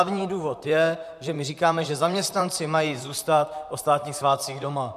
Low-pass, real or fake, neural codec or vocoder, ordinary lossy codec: 14.4 kHz; fake; vocoder, 44.1 kHz, 128 mel bands, Pupu-Vocoder; MP3, 96 kbps